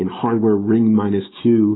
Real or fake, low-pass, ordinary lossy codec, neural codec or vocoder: real; 7.2 kHz; AAC, 16 kbps; none